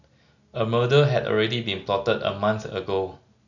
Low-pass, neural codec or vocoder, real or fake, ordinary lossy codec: 7.2 kHz; none; real; none